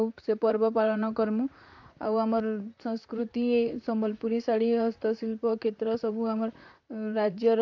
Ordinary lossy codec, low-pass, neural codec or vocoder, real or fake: AAC, 48 kbps; 7.2 kHz; vocoder, 44.1 kHz, 128 mel bands, Pupu-Vocoder; fake